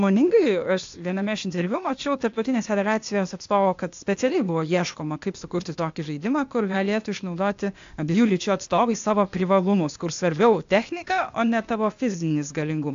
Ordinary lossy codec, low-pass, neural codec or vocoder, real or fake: AAC, 64 kbps; 7.2 kHz; codec, 16 kHz, 0.8 kbps, ZipCodec; fake